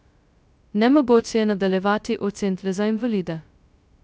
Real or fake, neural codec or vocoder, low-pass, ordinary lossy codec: fake; codec, 16 kHz, 0.2 kbps, FocalCodec; none; none